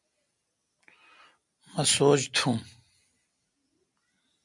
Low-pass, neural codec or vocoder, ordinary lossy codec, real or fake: 10.8 kHz; none; MP3, 64 kbps; real